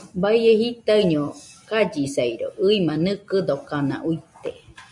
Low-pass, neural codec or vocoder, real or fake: 10.8 kHz; none; real